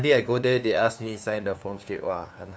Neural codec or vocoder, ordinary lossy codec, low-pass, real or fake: codec, 16 kHz, 2 kbps, FunCodec, trained on LibriTTS, 25 frames a second; none; none; fake